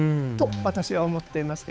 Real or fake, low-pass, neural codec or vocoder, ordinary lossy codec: fake; none; codec, 16 kHz, 4 kbps, X-Codec, HuBERT features, trained on balanced general audio; none